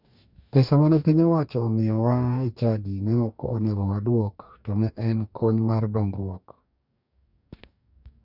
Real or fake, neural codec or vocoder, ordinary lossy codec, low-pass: fake; codec, 44.1 kHz, 2.6 kbps, DAC; none; 5.4 kHz